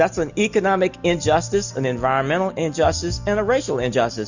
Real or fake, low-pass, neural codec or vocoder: real; 7.2 kHz; none